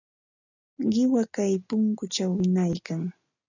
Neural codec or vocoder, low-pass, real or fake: none; 7.2 kHz; real